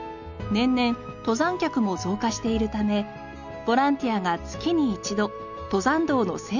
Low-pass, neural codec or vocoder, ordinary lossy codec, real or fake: 7.2 kHz; none; none; real